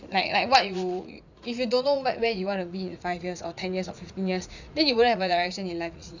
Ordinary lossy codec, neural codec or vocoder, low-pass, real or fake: none; vocoder, 44.1 kHz, 80 mel bands, Vocos; 7.2 kHz; fake